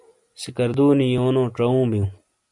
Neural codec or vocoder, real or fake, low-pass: none; real; 10.8 kHz